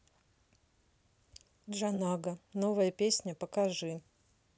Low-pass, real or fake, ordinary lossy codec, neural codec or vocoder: none; real; none; none